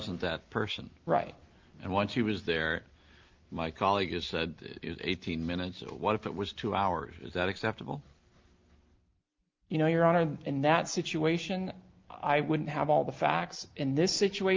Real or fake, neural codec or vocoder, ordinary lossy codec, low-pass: real; none; Opus, 32 kbps; 7.2 kHz